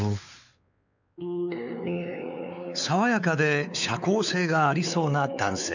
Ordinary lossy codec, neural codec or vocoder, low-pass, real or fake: none; codec, 16 kHz, 4 kbps, X-Codec, WavLM features, trained on Multilingual LibriSpeech; 7.2 kHz; fake